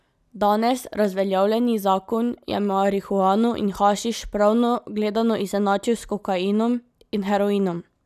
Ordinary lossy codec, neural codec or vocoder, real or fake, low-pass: none; none; real; 14.4 kHz